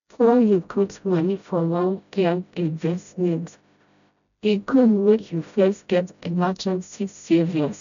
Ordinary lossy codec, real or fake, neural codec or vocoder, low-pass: none; fake; codec, 16 kHz, 0.5 kbps, FreqCodec, smaller model; 7.2 kHz